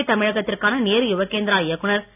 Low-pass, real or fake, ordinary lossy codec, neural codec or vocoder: 3.6 kHz; real; AAC, 32 kbps; none